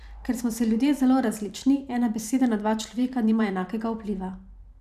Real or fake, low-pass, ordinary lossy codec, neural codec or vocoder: fake; 14.4 kHz; none; vocoder, 44.1 kHz, 128 mel bands every 512 samples, BigVGAN v2